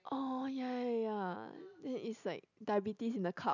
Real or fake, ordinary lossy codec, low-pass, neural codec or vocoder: real; none; 7.2 kHz; none